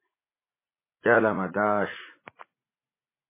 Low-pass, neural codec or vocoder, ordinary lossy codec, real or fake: 3.6 kHz; vocoder, 44.1 kHz, 80 mel bands, Vocos; MP3, 16 kbps; fake